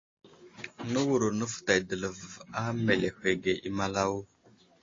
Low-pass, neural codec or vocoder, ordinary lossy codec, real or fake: 7.2 kHz; none; AAC, 32 kbps; real